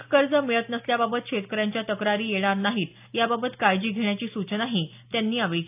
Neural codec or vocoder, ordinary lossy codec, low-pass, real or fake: none; AAC, 32 kbps; 3.6 kHz; real